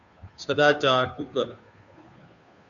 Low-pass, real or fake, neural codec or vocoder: 7.2 kHz; fake; codec, 16 kHz, 2 kbps, FunCodec, trained on Chinese and English, 25 frames a second